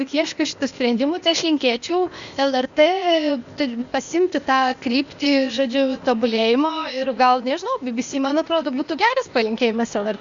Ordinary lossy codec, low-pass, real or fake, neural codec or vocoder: Opus, 64 kbps; 7.2 kHz; fake; codec, 16 kHz, 0.8 kbps, ZipCodec